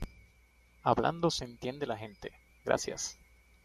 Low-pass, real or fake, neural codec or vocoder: 14.4 kHz; real; none